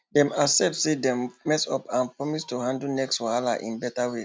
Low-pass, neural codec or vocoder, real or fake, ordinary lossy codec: none; none; real; none